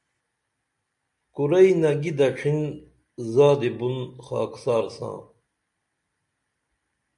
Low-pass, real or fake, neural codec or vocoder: 10.8 kHz; real; none